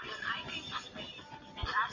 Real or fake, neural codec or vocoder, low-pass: real; none; 7.2 kHz